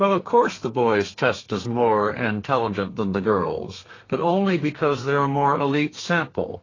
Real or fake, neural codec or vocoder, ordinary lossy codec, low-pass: fake; codec, 32 kHz, 1.9 kbps, SNAC; AAC, 32 kbps; 7.2 kHz